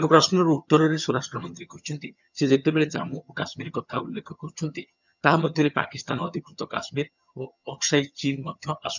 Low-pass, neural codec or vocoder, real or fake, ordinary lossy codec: 7.2 kHz; vocoder, 22.05 kHz, 80 mel bands, HiFi-GAN; fake; none